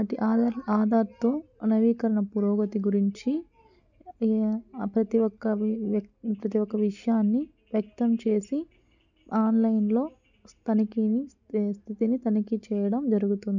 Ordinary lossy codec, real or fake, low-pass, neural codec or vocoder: none; real; 7.2 kHz; none